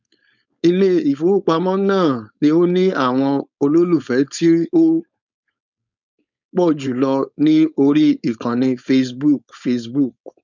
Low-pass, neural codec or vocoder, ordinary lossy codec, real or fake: 7.2 kHz; codec, 16 kHz, 4.8 kbps, FACodec; none; fake